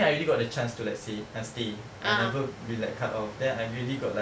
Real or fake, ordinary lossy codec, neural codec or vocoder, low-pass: real; none; none; none